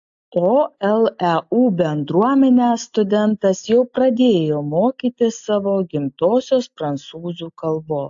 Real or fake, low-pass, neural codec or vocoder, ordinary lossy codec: real; 7.2 kHz; none; AAC, 64 kbps